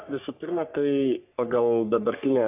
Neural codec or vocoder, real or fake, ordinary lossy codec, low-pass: codec, 44.1 kHz, 3.4 kbps, Pupu-Codec; fake; AAC, 32 kbps; 3.6 kHz